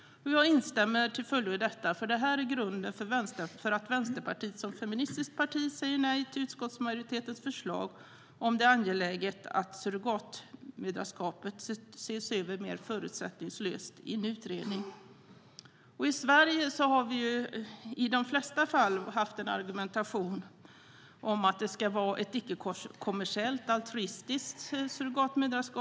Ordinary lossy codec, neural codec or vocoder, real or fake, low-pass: none; none; real; none